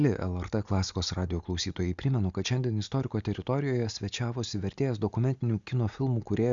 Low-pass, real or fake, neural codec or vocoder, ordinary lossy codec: 7.2 kHz; real; none; Opus, 64 kbps